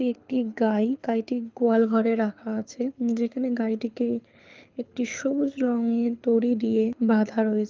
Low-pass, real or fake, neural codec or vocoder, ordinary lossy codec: 7.2 kHz; fake; codec, 24 kHz, 6 kbps, HILCodec; Opus, 32 kbps